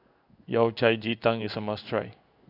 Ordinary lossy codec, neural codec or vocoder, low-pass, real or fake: none; codec, 16 kHz in and 24 kHz out, 1 kbps, XY-Tokenizer; 5.4 kHz; fake